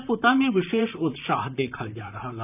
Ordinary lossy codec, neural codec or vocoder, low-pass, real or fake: none; vocoder, 44.1 kHz, 128 mel bands, Pupu-Vocoder; 3.6 kHz; fake